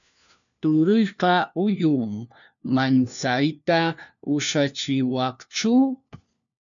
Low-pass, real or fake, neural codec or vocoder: 7.2 kHz; fake; codec, 16 kHz, 1 kbps, FunCodec, trained on LibriTTS, 50 frames a second